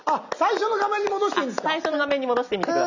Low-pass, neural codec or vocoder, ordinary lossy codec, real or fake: 7.2 kHz; none; none; real